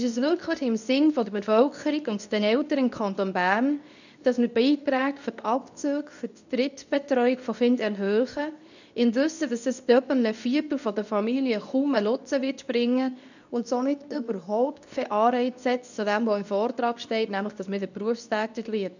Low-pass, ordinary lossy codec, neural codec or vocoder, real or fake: 7.2 kHz; none; codec, 24 kHz, 0.9 kbps, WavTokenizer, medium speech release version 2; fake